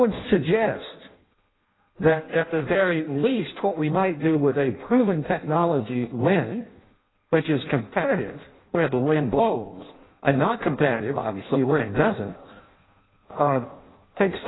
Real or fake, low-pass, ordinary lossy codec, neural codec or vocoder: fake; 7.2 kHz; AAC, 16 kbps; codec, 16 kHz in and 24 kHz out, 0.6 kbps, FireRedTTS-2 codec